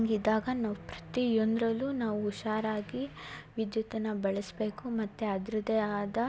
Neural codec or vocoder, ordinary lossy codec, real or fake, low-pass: none; none; real; none